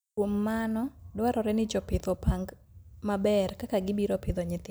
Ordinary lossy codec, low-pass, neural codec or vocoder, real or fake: none; none; none; real